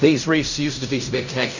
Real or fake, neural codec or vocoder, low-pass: fake; codec, 16 kHz in and 24 kHz out, 0.4 kbps, LongCat-Audio-Codec, fine tuned four codebook decoder; 7.2 kHz